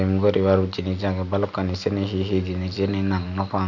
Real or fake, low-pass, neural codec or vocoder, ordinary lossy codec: real; 7.2 kHz; none; none